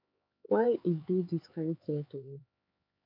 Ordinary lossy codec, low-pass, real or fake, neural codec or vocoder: MP3, 48 kbps; 5.4 kHz; fake; codec, 16 kHz, 4 kbps, X-Codec, HuBERT features, trained on LibriSpeech